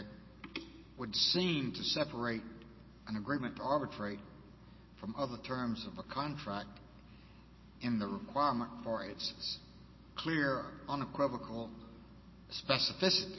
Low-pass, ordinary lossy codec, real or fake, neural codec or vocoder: 7.2 kHz; MP3, 24 kbps; real; none